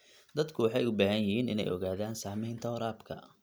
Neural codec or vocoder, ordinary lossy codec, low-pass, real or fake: none; none; none; real